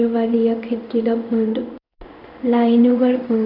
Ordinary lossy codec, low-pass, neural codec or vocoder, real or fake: none; 5.4 kHz; codec, 16 kHz in and 24 kHz out, 1 kbps, XY-Tokenizer; fake